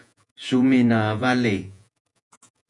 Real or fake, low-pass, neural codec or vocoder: fake; 10.8 kHz; vocoder, 48 kHz, 128 mel bands, Vocos